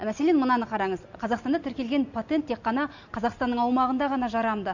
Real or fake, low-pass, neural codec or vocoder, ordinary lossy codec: real; 7.2 kHz; none; none